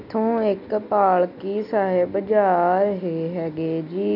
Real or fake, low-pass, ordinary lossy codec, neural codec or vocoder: real; 5.4 kHz; none; none